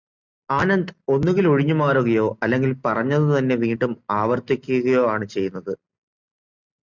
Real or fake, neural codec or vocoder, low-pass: real; none; 7.2 kHz